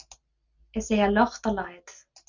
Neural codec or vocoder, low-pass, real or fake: none; 7.2 kHz; real